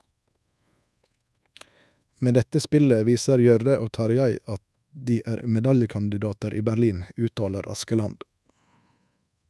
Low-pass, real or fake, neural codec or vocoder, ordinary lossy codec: none; fake; codec, 24 kHz, 1.2 kbps, DualCodec; none